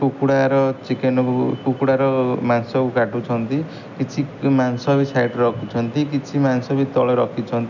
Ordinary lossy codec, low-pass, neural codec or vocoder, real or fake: none; 7.2 kHz; none; real